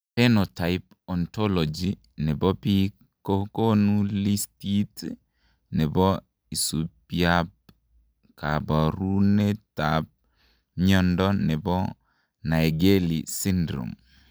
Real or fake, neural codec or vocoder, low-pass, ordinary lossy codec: real; none; none; none